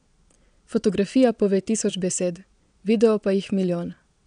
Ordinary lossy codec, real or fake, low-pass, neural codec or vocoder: none; fake; 9.9 kHz; vocoder, 22.05 kHz, 80 mel bands, Vocos